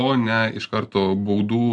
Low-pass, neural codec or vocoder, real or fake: 9.9 kHz; none; real